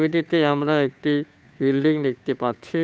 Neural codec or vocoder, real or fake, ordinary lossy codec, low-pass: codec, 16 kHz, 2 kbps, FunCodec, trained on Chinese and English, 25 frames a second; fake; none; none